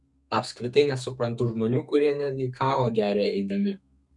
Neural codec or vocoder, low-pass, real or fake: codec, 32 kHz, 1.9 kbps, SNAC; 10.8 kHz; fake